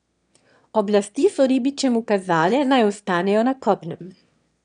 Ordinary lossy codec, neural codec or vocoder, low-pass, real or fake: none; autoencoder, 22.05 kHz, a latent of 192 numbers a frame, VITS, trained on one speaker; 9.9 kHz; fake